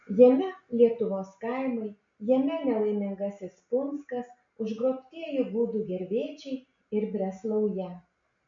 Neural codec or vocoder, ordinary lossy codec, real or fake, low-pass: none; MP3, 48 kbps; real; 7.2 kHz